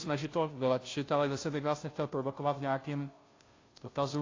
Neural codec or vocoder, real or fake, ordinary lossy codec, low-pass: codec, 16 kHz, 0.5 kbps, FunCodec, trained on Chinese and English, 25 frames a second; fake; AAC, 32 kbps; 7.2 kHz